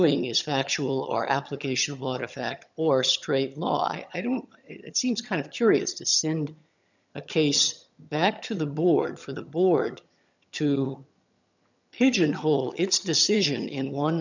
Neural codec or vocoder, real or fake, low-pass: vocoder, 22.05 kHz, 80 mel bands, HiFi-GAN; fake; 7.2 kHz